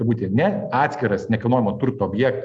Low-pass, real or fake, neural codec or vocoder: 9.9 kHz; real; none